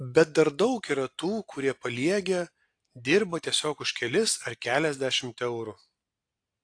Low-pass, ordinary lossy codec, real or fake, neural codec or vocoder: 9.9 kHz; AAC, 48 kbps; real; none